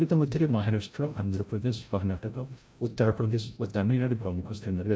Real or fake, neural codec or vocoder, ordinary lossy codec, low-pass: fake; codec, 16 kHz, 0.5 kbps, FreqCodec, larger model; none; none